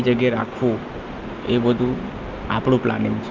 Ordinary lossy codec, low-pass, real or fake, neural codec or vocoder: Opus, 24 kbps; 7.2 kHz; real; none